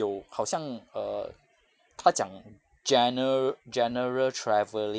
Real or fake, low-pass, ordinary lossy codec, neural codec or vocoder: real; none; none; none